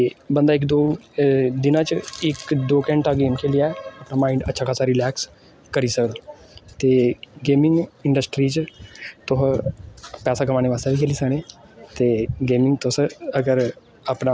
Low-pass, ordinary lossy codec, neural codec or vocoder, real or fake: none; none; none; real